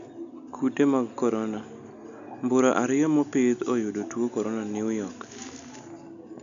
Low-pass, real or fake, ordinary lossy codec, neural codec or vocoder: 7.2 kHz; real; none; none